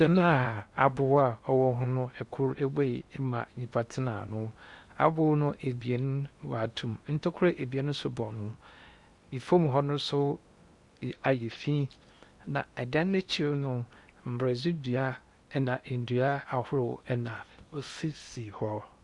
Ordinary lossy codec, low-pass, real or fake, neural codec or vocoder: Opus, 64 kbps; 10.8 kHz; fake; codec, 16 kHz in and 24 kHz out, 0.8 kbps, FocalCodec, streaming, 65536 codes